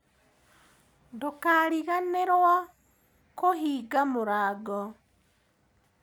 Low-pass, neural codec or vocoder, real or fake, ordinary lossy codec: none; none; real; none